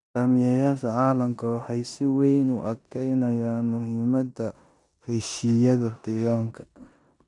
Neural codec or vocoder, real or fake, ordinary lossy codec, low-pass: codec, 16 kHz in and 24 kHz out, 0.9 kbps, LongCat-Audio-Codec, four codebook decoder; fake; none; 10.8 kHz